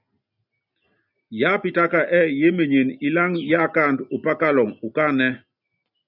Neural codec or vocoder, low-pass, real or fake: none; 5.4 kHz; real